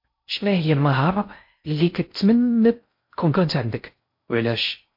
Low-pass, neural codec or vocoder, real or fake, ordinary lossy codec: 5.4 kHz; codec, 16 kHz in and 24 kHz out, 0.6 kbps, FocalCodec, streaming, 2048 codes; fake; MP3, 32 kbps